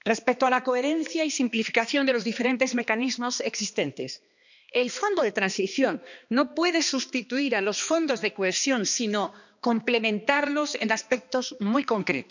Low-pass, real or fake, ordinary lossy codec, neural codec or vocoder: 7.2 kHz; fake; none; codec, 16 kHz, 2 kbps, X-Codec, HuBERT features, trained on balanced general audio